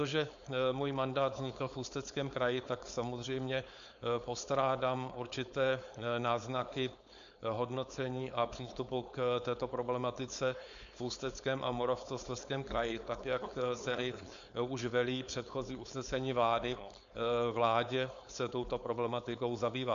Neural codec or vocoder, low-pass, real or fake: codec, 16 kHz, 4.8 kbps, FACodec; 7.2 kHz; fake